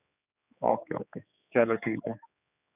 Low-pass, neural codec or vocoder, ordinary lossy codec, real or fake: 3.6 kHz; codec, 16 kHz, 4 kbps, X-Codec, HuBERT features, trained on general audio; none; fake